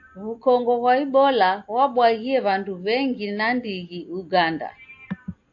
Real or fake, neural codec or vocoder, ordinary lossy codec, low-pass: real; none; AAC, 48 kbps; 7.2 kHz